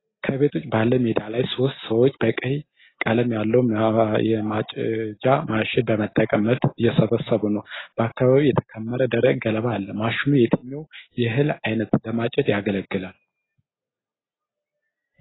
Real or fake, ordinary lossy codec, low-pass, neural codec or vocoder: real; AAC, 16 kbps; 7.2 kHz; none